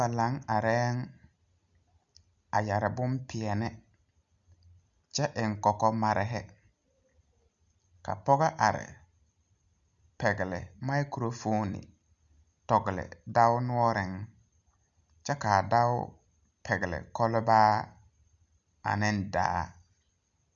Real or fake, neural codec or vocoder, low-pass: real; none; 7.2 kHz